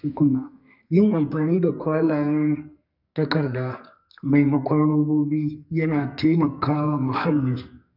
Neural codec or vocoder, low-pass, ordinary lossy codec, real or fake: codec, 44.1 kHz, 2.6 kbps, SNAC; 5.4 kHz; none; fake